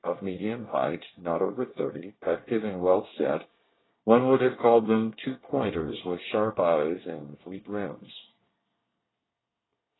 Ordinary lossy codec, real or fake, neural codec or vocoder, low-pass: AAC, 16 kbps; fake; codec, 24 kHz, 1 kbps, SNAC; 7.2 kHz